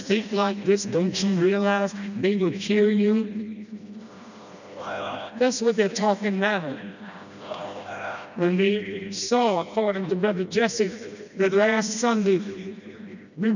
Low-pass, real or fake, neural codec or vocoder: 7.2 kHz; fake; codec, 16 kHz, 1 kbps, FreqCodec, smaller model